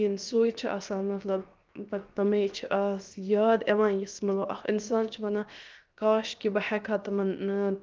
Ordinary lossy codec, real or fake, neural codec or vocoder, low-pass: Opus, 32 kbps; fake; codec, 16 kHz, 0.7 kbps, FocalCodec; 7.2 kHz